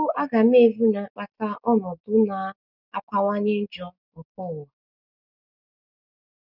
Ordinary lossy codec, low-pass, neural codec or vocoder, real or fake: none; 5.4 kHz; none; real